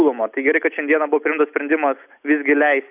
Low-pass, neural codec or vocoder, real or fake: 3.6 kHz; none; real